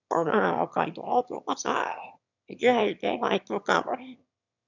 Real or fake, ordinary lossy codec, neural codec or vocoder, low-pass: fake; none; autoencoder, 22.05 kHz, a latent of 192 numbers a frame, VITS, trained on one speaker; 7.2 kHz